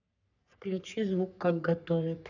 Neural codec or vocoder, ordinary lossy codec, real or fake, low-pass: codec, 44.1 kHz, 3.4 kbps, Pupu-Codec; none; fake; 7.2 kHz